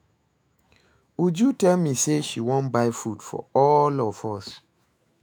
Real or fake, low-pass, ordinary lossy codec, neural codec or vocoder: fake; none; none; autoencoder, 48 kHz, 128 numbers a frame, DAC-VAE, trained on Japanese speech